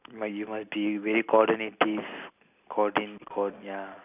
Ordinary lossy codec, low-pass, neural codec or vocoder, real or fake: none; 3.6 kHz; none; real